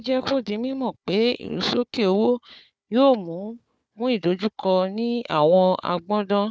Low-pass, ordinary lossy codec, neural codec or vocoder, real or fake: none; none; codec, 16 kHz, 4 kbps, FunCodec, trained on Chinese and English, 50 frames a second; fake